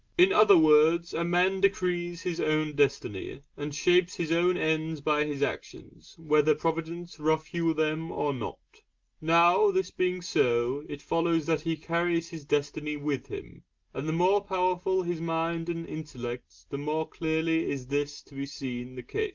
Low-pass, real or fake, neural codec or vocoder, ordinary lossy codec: 7.2 kHz; real; none; Opus, 24 kbps